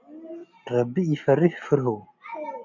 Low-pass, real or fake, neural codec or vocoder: 7.2 kHz; real; none